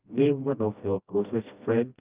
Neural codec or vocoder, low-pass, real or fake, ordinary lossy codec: codec, 16 kHz, 1 kbps, FreqCodec, smaller model; 3.6 kHz; fake; Opus, 24 kbps